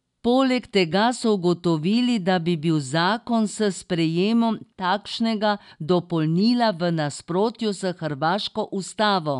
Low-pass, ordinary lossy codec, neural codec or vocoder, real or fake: 10.8 kHz; none; none; real